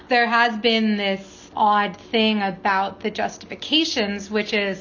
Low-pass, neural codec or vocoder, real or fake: 7.2 kHz; none; real